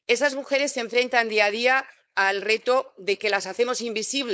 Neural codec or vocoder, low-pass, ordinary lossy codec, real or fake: codec, 16 kHz, 4.8 kbps, FACodec; none; none; fake